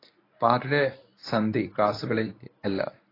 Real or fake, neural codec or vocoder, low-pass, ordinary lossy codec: fake; codec, 24 kHz, 0.9 kbps, WavTokenizer, medium speech release version 1; 5.4 kHz; AAC, 24 kbps